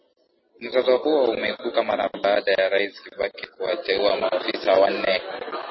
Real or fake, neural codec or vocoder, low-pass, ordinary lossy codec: real; none; 7.2 kHz; MP3, 24 kbps